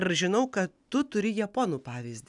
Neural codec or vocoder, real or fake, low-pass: none; real; 10.8 kHz